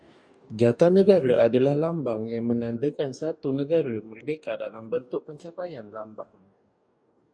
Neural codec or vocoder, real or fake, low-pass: codec, 44.1 kHz, 2.6 kbps, DAC; fake; 9.9 kHz